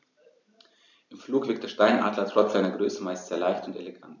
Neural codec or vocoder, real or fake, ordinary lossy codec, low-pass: none; real; none; 7.2 kHz